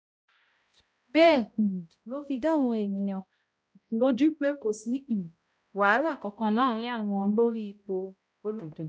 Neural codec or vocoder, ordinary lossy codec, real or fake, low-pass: codec, 16 kHz, 0.5 kbps, X-Codec, HuBERT features, trained on balanced general audio; none; fake; none